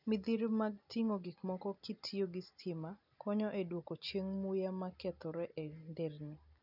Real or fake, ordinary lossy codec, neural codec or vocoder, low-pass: real; none; none; 5.4 kHz